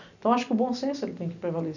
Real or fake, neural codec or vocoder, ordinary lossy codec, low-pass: real; none; none; 7.2 kHz